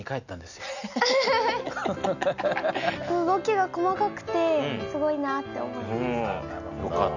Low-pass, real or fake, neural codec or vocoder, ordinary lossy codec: 7.2 kHz; real; none; none